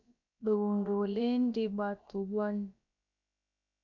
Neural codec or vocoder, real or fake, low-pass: codec, 16 kHz, about 1 kbps, DyCAST, with the encoder's durations; fake; 7.2 kHz